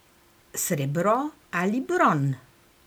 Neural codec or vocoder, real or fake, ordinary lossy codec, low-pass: none; real; none; none